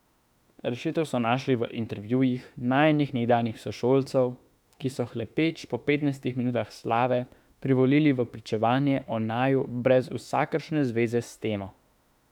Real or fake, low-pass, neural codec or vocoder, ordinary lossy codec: fake; 19.8 kHz; autoencoder, 48 kHz, 32 numbers a frame, DAC-VAE, trained on Japanese speech; none